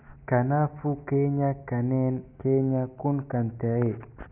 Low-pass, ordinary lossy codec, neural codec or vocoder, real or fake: 3.6 kHz; none; none; real